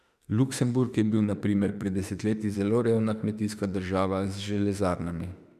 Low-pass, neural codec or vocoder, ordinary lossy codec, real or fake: 14.4 kHz; autoencoder, 48 kHz, 32 numbers a frame, DAC-VAE, trained on Japanese speech; none; fake